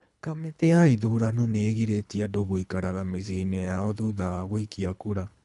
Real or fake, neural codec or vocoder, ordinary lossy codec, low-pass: fake; codec, 24 kHz, 3 kbps, HILCodec; none; 10.8 kHz